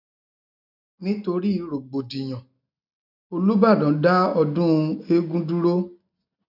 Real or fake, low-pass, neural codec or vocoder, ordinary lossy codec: real; 5.4 kHz; none; none